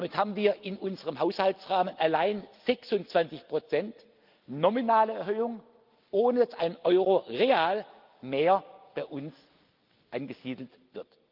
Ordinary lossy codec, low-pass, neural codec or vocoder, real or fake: Opus, 24 kbps; 5.4 kHz; none; real